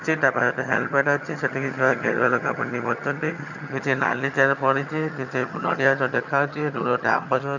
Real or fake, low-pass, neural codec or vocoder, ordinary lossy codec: fake; 7.2 kHz; vocoder, 22.05 kHz, 80 mel bands, HiFi-GAN; none